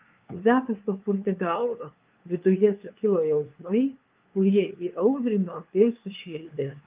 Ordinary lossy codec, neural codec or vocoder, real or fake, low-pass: Opus, 32 kbps; codec, 16 kHz, 2 kbps, FunCodec, trained on LibriTTS, 25 frames a second; fake; 3.6 kHz